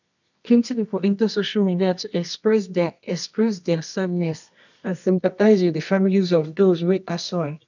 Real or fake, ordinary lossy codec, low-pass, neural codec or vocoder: fake; none; 7.2 kHz; codec, 24 kHz, 0.9 kbps, WavTokenizer, medium music audio release